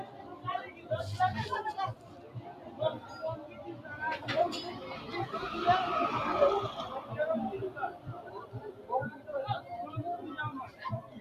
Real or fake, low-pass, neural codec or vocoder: fake; 14.4 kHz; vocoder, 44.1 kHz, 128 mel bands every 256 samples, BigVGAN v2